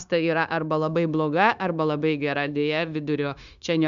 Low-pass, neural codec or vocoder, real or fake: 7.2 kHz; codec, 16 kHz, 0.9 kbps, LongCat-Audio-Codec; fake